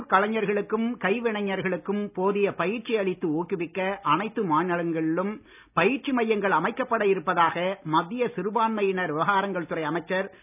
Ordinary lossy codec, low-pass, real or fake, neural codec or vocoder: none; 3.6 kHz; real; none